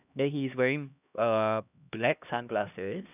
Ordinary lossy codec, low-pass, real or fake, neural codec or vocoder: none; 3.6 kHz; fake; codec, 16 kHz, 1 kbps, X-Codec, HuBERT features, trained on LibriSpeech